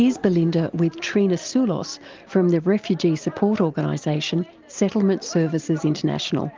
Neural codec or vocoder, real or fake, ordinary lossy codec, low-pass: none; real; Opus, 32 kbps; 7.2 kHz